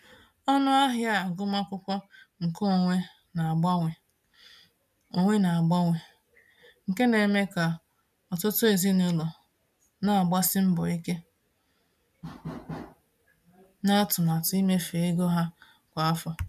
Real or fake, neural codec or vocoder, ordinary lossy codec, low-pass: real; none; none; 14.4 kHz